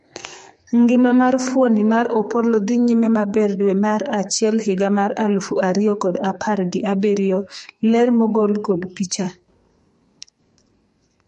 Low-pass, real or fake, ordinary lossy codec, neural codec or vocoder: 14.4 kHz; fake; MP3, 48 kbps; codec, 44.1 kHz, 2.6 kbps, SNAC